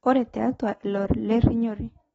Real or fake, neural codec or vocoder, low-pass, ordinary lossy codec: real; none; 19.8 kHz; AAC, 24 kbps